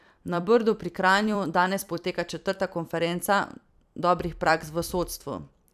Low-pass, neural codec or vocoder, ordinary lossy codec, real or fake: 14.4 kHz; vocoder, 44.1 kHz, 128 mel bands every 256 samples, BigVGAN v2; none; fake